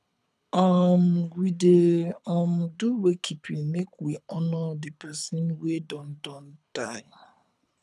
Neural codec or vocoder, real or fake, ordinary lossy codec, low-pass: codec, 24 kHz, 6 kbps, HILCodec; fake; none; none